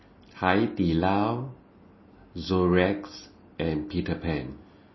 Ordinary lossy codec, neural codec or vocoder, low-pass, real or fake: MP3, 24 kbps; none; 7.2 kHz; real